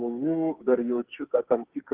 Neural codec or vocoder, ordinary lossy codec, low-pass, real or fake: codec, 44.1 kHz, 2.6 kbps, SNAC; Opus, 16 kbps; 3.6 kHz; fake